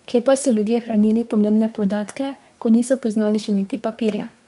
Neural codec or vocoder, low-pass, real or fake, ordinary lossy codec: codec, 24 kHz, 1 kbps, SNAC; 10.8 kHz; fake; none